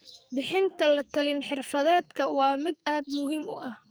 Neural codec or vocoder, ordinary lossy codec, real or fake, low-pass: codec, 44.1 kHz, 2.6 kbps, SNAC; none; fake; none